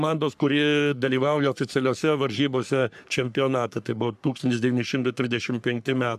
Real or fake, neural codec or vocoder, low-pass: fake; codec, 44.1 kHz, 3.4 kbps, Pupu-Codec; 14.4 kHz